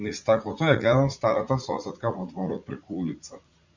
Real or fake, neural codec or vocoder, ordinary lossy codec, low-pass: fake; vocoder, 44.1 kHz, 80 mel bands, Vocos; Opus, 64 kbps; 7.2 kHz